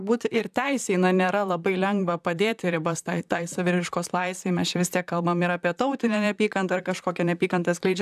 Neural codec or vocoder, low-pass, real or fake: vocoder, 44.1 kHz, 128 mel bands, Pupu-Vocoder; 14.4 kHz; fake